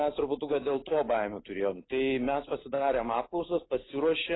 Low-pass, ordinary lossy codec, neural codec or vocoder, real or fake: 7.2 kHz; AAC, 16 kbps; none; real